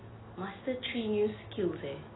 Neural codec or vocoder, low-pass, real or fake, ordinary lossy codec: none; 7.2 kHz; real; AAC, 16 kbps